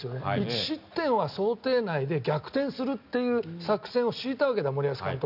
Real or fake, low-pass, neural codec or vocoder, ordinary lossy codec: real; 5.4 kHz; none; none